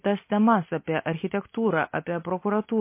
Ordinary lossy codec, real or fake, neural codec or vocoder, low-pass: MP3, 24 kbps; real; none; 3.6 kHz